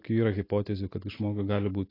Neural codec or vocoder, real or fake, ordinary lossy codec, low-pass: none; real; AAC, 24 kbps; 5.4 kHz